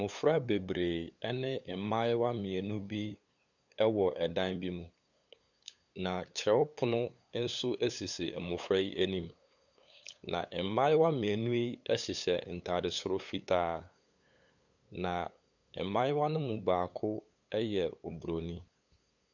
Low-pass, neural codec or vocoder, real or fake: 7.2 kHz; codec, 16 kHz, 8 kbps, FunCodec, trained on LibriTTS, 25 frames a second; fake